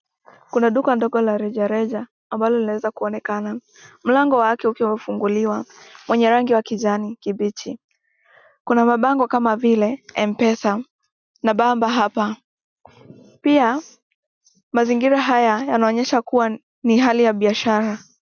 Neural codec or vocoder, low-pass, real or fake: none; 7.2 kHz; real